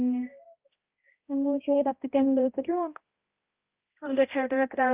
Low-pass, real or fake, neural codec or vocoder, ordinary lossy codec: 3.6 kHz; fake; codec, 16 kHz, 0.5 kbps, X-Codec, HuBERT features, trained on balanced general audio; Opus, 32 kbps